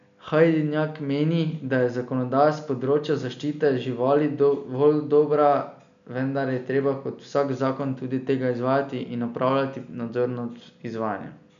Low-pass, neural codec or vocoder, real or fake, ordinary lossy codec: 7.2 kHz; none; real; none